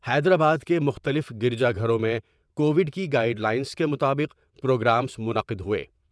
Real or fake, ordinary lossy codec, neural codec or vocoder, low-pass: fake; none; vocoder, 22.05 kHz, 80 mel bands, WaveNeXt; none